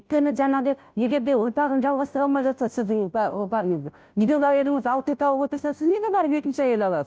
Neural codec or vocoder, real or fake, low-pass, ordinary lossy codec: codec, 16 kHz, 0.5 kbps, FunCodec, trained on Chinese and English, 25 frames a second; fake; none; none